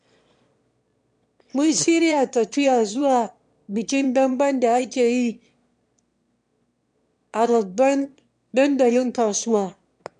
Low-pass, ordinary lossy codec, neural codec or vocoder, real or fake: 9.9 kHz; MP3, 64 kbps; autoencoder, 22.05 kHz, a latent of 192 numbers a frame, VITS, trained on one speaker; fake